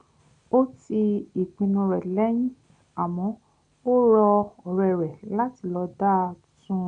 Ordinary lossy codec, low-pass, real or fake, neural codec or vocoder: none; 9.9 kHz; real; none